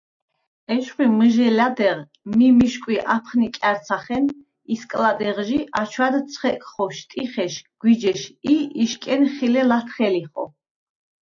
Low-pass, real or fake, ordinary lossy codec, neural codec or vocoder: 7.2 kHz; real; AAC, 64 kbps; none